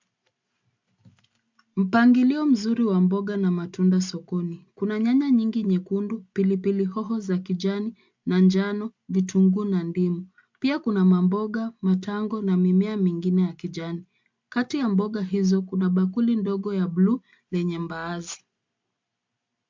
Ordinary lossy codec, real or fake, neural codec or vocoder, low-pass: MP3, 64 kbps; real; none; 7.2 kHz